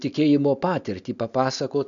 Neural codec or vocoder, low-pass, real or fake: none; 7.2 kHz; real